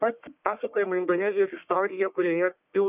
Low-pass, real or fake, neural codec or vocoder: 3.6 kHz; fake; codec, 44.1 kHz, 1.7 kbps, Pupu-Codec